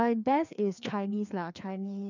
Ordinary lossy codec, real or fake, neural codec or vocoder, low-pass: none; fake; codec, 16 kHz, 2 kbps, FreqCodec, larger model; 7.2 kHz